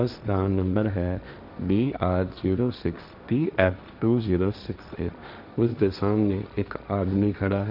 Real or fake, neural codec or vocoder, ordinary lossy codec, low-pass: fake; codec, 16 kHz, 1.1 kbps, Voila-Tokenizer; none; 5.4 kHz